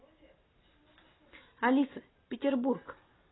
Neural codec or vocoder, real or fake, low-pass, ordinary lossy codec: none; real; 7.2 kHz; AAC, 16 kbps